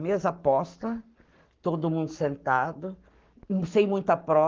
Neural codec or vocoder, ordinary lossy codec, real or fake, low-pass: codec, 44.1 kHz, 7.8 kbps, Pupu-Codec; Opus, 32 kbps; fake; 7.2 kHz